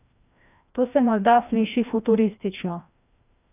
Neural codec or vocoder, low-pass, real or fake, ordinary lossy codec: codec, 16 kHz, 1 kbps, FreqCodec, larger model; 3.6 kHz; fake; none